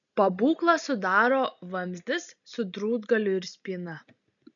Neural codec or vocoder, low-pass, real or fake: none; 7.2 kHz; real